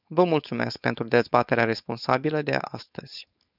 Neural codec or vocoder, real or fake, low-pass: codec, 16 kHz, 4.8 kbps, FACodec; fake; 5.4 kHz